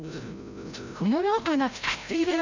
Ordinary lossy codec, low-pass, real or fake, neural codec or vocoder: none; 7.2 kHz; fake; codec, 16 kHz, 0.5 kbps, FreqCodec, larger model